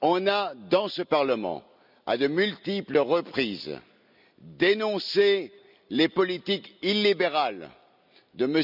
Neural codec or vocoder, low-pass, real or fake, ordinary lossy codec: none; 5.4 kHz; real; none